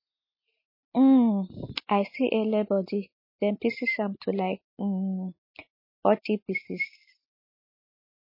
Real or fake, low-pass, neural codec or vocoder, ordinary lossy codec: real; 5.4 kHz; none; MP3, 24 kbps